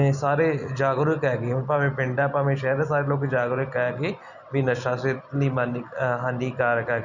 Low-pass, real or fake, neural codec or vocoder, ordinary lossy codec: 7.2 kHz; real; none; none